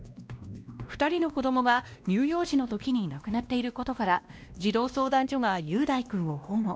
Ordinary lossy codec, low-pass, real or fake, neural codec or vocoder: none; none; fake; codec, 16 kHz, 1 kbps, X-Codec, WavLM features, trained on Multilingual LibriSpeech